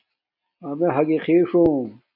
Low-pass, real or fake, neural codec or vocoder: 5.4 kHz; real; none